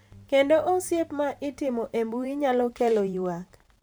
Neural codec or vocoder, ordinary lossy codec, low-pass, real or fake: vocoder, 44.1 kHz, 128 mel bands every 512 samples, BigVGAN v2; none; none; fake